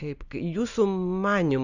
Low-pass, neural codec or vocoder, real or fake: 7.2 kHz; none; real